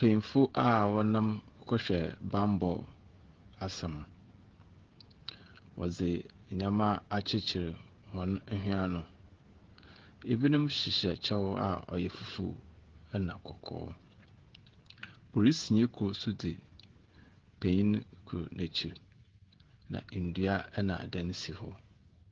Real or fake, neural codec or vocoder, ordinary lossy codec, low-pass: fake; codec, 16 kHz, 16 kbps, FreqCodec, smaller model; Opus, 16 kbps; 7.2 kHz